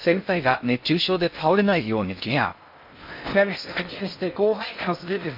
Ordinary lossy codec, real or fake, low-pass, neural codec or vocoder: MP3, 48 kbps; fake; 5.4 kHz; codec, 16 kHz in and 24 kHz out, 0.6 kbps, FocalCodec, streaming, 2048 codes